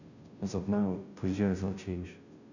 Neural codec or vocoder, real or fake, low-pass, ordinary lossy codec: codec, 16 kHz, 0.5 kbps, FunCodec, trained on Chinese and English, 25 frames a second; fake; 7.2 kHz; none